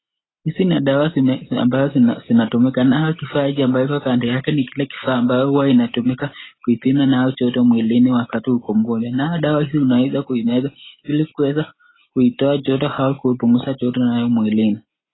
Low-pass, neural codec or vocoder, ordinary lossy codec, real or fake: 7.2 kHz; none; AAC, 16 kbps; real